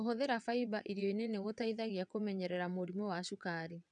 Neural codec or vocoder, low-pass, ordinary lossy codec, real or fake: vocoder, 22.05 kHz, 80 mel bands, WaveNeXt; 9.9 kHz; none; fake